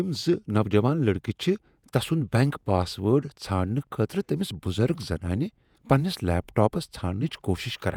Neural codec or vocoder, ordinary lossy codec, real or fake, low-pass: vocoder, 48 kHz, 128 mel bands, Vocos; none; fake; 19.8 kHz